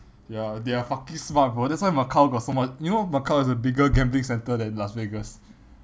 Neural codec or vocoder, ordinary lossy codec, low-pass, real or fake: none; none; none; real